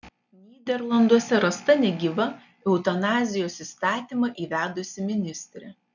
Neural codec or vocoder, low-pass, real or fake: none; 7.2 kHz; real